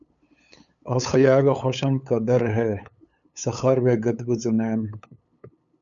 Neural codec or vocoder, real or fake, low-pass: codec, 16 kHz, 8 kbps, FunCodec, trained on LibriTTS, 25 frames a second; fake; 7.2 kHz